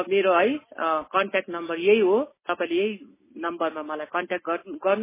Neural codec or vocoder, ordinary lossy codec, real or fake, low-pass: none; MP3, 16 kbps; real; 3.6 kHz